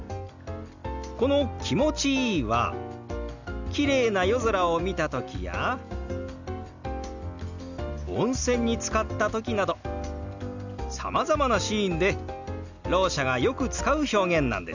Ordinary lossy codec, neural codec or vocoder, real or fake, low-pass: none; none; real; 7.2 kHz